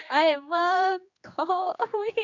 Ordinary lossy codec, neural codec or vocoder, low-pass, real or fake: Opus, 64 kbps; codec, 16 kHz, 2 kbps, X-Codec, HuBERT features, trained on general audio; 7.2 kHz; fake